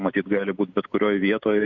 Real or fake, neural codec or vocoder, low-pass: real; none; 7.2 kHz